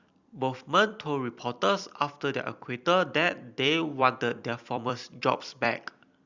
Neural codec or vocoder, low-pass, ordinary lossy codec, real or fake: vocoder, 44.1 kHz, 128 mel bands every 256 samples, BigVGAN v2; 7.2 kHz; Opus, 64 kbps; fake